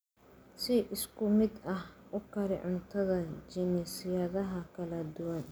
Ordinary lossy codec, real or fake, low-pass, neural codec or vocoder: none; real; none; none